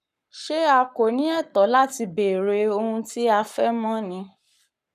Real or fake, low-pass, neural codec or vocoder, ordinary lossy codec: fake; 14.4 kHz; codec, 44.1 kHz, 7.8 kbps, Pupu-Codec; none